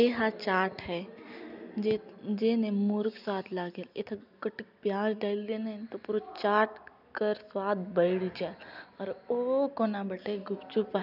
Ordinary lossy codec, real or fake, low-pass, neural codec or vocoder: none; real; 5.4 kHz; none